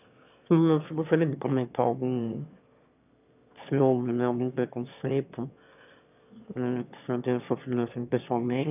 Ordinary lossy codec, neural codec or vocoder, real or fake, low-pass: none; autoencoder, 22.05 kHz, a latent of 192 numbers a frame, VITS, trained on one speaker; fake; 3.6 kHz